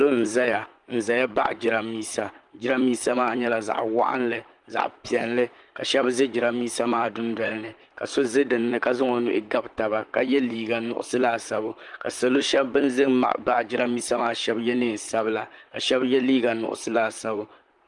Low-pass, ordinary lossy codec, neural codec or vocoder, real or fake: 10.8 kHz; Opus, 32 kbps; vocoder, 44.1 kHz, 128 mel bands, Pupu-Vocoder; fake